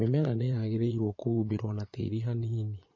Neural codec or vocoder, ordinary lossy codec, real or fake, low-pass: vocoder, 22.05 kHz, 80 mel bands, Vocos; MP3, 32 kbps; fake; 7.2 kHz